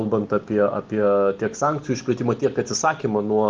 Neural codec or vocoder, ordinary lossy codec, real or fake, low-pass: none; Opus, 24 kbps; real; 7.2 kHz